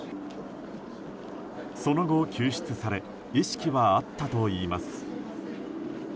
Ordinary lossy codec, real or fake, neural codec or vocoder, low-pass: none; real; none; none